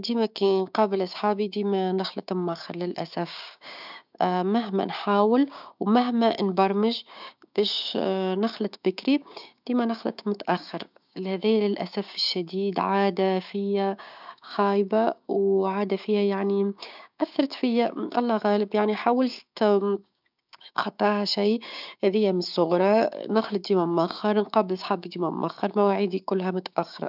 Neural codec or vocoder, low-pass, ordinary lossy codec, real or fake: codec, 16 kHz, 6 kbps, DAC; 5.4 kHz; none; fake